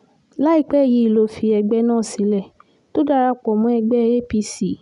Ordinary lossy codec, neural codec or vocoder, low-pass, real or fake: none; none; 10.8 kHz; real